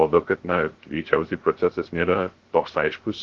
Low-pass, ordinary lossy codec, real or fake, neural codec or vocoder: 7.2 kHz; Opus, 16 kbps; fake; codec, 16 kHz, 0.3 kbps, FocalCodec